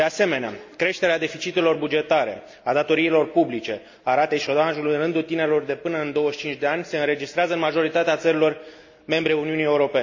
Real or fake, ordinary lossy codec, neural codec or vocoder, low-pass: real; MP3, 64 kbps; none; 7.2 kHz